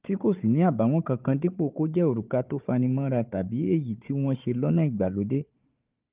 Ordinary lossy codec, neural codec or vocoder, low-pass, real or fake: Opus, 24 kbps; codec, 16 kHz, 4 kbps, FunCodec, trained on Chinese and English, 50 frames a second; 3.6 kHz; fake